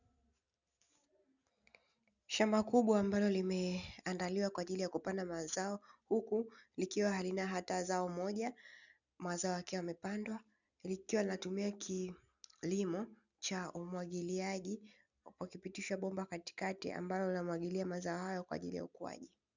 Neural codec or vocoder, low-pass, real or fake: none; 7.2 kHz; real